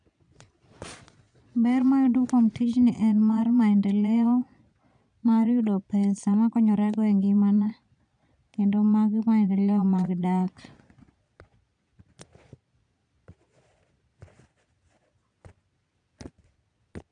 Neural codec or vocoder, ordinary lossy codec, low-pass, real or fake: vocoder, 22.05 kHz, 80 mel bands, Vocos; none; 9.9 kHz; fake